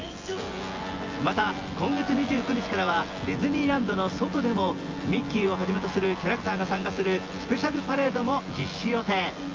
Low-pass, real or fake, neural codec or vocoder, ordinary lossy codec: 7.2 kHz; fake; vocoder, 24 kHz, 100 mel bands, Vocos; Opus, 32 kbps